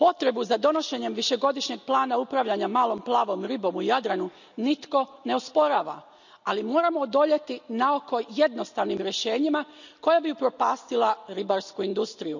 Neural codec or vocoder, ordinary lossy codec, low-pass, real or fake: none; none; 7.2 kHz; real